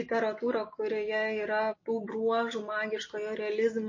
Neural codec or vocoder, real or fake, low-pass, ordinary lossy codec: none; real; 7.2 kHz; MP3, 32 kbps